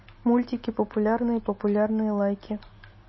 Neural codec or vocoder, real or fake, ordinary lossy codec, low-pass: none; real; MP3, 24 kbps; 7.2 kHz